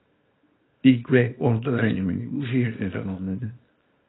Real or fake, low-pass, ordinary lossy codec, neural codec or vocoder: fake; 7.2 kHz; AAC, 16 kbps; codec, 24 kHz, 0.9 kbps, WavTokenizer, small release